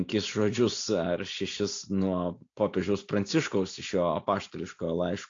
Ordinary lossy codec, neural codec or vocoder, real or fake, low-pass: AAC, 48 kbps; none; real; 7.2 kHz